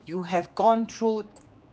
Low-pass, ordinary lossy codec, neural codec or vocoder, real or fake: none; none; codec, 16 kHz, 2 kbps, X-Codec, HuBERT features, trained on general audio; fake